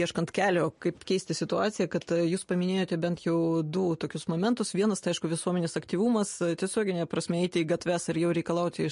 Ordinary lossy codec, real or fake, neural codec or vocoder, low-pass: MP3, 48 kbps; real; none; 10.8 kHz